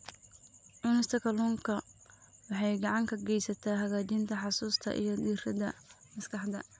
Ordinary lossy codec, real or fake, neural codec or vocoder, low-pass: none; real; none; none